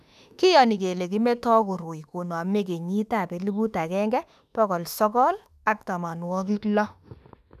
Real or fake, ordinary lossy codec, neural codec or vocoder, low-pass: fake; none; autoencoder, 48 kHz, 32 numbers a frame, DAC-VAE, trained on Japanese speech; 14.4 kHz